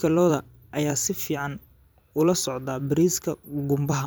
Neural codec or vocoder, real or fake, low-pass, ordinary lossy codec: vocoder, 44.1 kHz, 128 mel bands every 256 samples, BigVGAN v2; fake; none; none